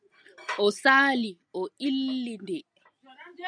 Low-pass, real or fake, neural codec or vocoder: 9.9 kHz; real; none